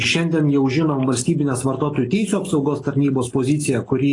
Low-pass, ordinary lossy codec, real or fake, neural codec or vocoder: 10.8 kHz; AAC, 32 kbps; real; none